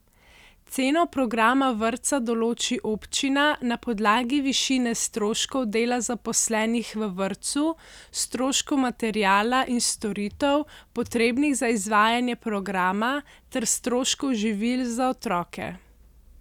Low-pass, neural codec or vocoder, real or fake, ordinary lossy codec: 19.8 kHz; none; real; none